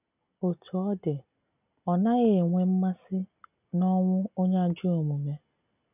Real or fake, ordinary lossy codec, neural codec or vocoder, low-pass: real; none; none; 3.6 kHz